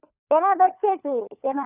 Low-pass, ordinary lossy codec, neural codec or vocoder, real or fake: 3.6 kHz; none; codec, 16 kHz, 4 kbps, FunCodec, trained on LibriTTS, 50 frames a second; fake